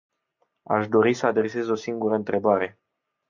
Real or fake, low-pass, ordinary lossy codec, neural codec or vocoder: real; 7.2 kHz; MP3, 64 kbps; none